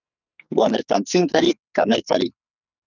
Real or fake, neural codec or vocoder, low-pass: fake; codec, 44.1 kHz, 2.6 kbps, SNAC; 7.2 kHz